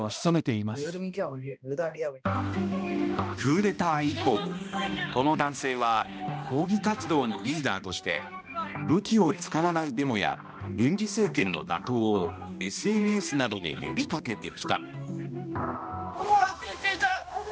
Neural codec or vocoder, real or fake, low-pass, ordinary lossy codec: codec, 16 kHz, 1 kbps, X-Codec, HuBERT features, trained on balanced general audio; fake; none; none